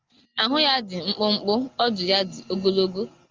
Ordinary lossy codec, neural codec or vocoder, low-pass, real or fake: Opus, 32 kbps; none; 7.2 kHz; real